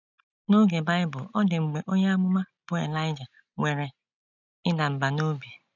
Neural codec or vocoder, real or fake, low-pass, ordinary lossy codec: none; real; 7.2 kHz; none